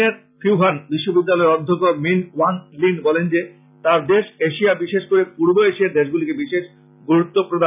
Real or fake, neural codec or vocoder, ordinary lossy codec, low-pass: real; none; none; 3.6 kHz